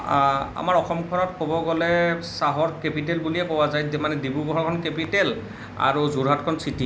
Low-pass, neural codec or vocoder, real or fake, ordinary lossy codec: none; none; real; none